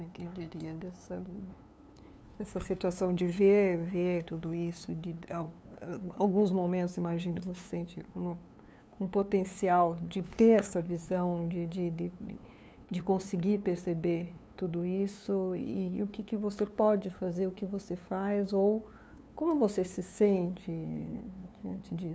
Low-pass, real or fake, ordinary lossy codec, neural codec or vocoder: none; fake; none; codec, 16 kHz, 2 kbps, FunCodec, trained on LibriTTS, 25 frames a second